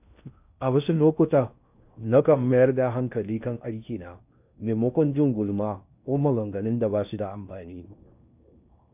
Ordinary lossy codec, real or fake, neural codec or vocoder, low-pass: none; fake; codec, 16 kHz in and 24 kHz out, 0.6 kbps, FocalCodec, streaming, 2048 codes; 3.6 kHz